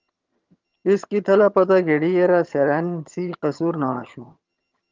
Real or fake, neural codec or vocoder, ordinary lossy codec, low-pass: fake; vocoder, 22.05 kHz, 80 mel bands, HiFi-GAN; Opus, 32 kbps; 7.2 kHz